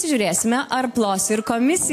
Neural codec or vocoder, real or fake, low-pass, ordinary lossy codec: none; real; 14.4 kHz; AAC, 64 kbps